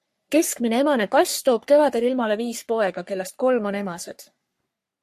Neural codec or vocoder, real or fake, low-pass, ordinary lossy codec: codec, 44.1 kHz, 3.4 kbps, Pupu-Codec; fake; 14.4 kHz; MP3, 64 kbps